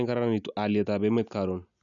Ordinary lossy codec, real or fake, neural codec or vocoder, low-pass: none; real; none; 7.2 kHz